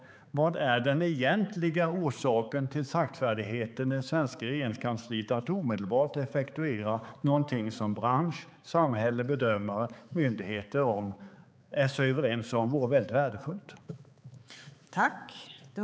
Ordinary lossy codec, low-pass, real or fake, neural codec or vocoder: none; none; fake; codec, 16 kHz, 4 kbps, X-Codec, HuBERT features, trained on balanced general audio